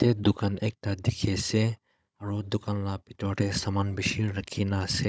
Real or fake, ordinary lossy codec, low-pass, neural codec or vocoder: fake; none; none; codec, 16 kHz, 16 kbps, FunCodec, trained on Chinese and English, 50 frames a second